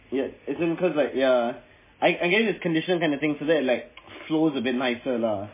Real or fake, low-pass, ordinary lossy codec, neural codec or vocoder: real; 3.6 kHz; MP3, 16 kbps; none